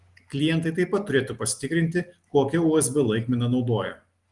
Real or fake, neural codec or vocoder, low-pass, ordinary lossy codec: real; none; 10.8 kHz; Opus, 24 kbps